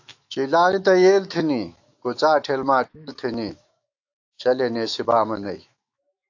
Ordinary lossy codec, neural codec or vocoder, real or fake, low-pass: AAC, 48 kbps; autoencoder, 48 kHz, 128 numbers a frame, DAC-VAE, trained on Japanese speech; fake; 7.2 kHz